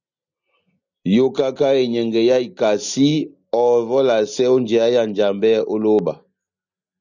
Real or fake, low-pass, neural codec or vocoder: real; 7.2 kHz; none